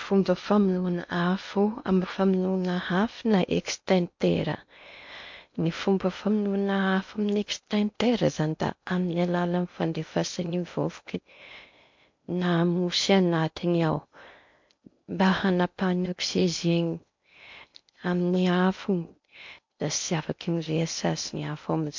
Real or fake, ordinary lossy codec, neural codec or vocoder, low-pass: fake; MP3, 48 kbps; codec, 16 kHz in and 24 kHz out, 0.8 kbps, FocalCodec, streaming, 65536 codes; 7.2 kHz